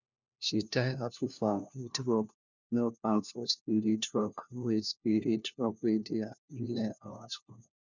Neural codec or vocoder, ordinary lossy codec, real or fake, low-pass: codec, 16 kHz, 1 kbps, FunCodec, trained on LibriTTS, 50 frames a second; none; fake; 7.2 kHz